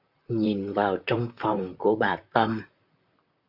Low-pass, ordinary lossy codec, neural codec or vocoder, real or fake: 5.4 kHz; Opus, 64 kbps; vocoder, 44.1 kHz, 128 mel bands, Pupu-Vocoder; fake